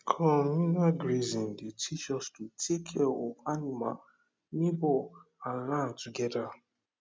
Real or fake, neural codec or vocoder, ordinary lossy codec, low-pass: real; none; none; none